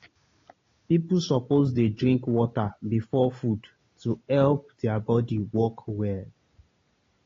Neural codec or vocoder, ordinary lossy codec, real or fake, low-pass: none; AAC, 32 kbps; real; 7.2 kHz